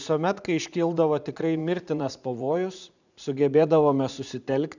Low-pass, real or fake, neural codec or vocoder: 7.2 kHz; fake; vocoder, 44.1 kHz, 80 mel bands, Vocos